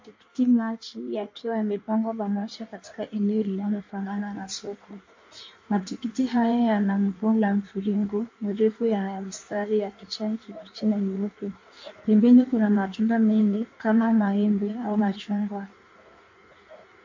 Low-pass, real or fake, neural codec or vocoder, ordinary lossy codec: 7.2 kHz; fake; codec, 16 kHz in and 24 kHz out, 1.1 kbps, FireRedTTS-2 codec; MP3, 48 kbps